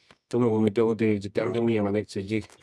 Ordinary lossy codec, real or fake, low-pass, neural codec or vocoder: none; fake; none; codec, 24 kHz, 0.9 kbps, WavTokenizer, medium music audio release